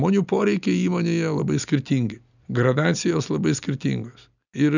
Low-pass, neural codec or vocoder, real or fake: 7.2 kHz; none; real